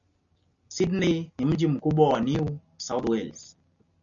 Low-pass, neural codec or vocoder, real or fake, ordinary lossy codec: 7.2 kHz; none; real; MP3, 96 kbps